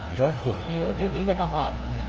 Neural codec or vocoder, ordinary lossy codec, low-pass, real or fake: codec, 16 kHz, 0.5 kbps, FunCodec, trained on LibriTTS, 25 frames a second; Opus, 24 kbps; 7.2 kHz; fake